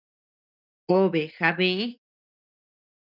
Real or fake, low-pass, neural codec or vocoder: real; 5.4 kHz; none